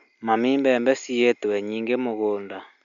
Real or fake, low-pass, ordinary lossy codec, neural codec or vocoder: real; 7.2 kHz; none; none